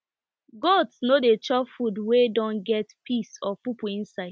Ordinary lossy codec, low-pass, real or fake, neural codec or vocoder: none; none; real; none